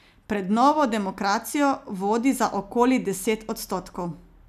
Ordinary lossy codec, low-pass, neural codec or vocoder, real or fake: none; 14.4 kHz; none; real